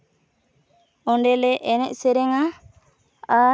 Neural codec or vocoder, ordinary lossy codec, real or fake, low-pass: none; none; real; none